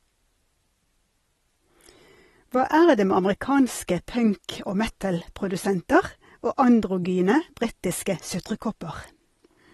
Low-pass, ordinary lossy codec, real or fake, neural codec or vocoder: 19.8 kHz; AAC, 32 kbps; real; none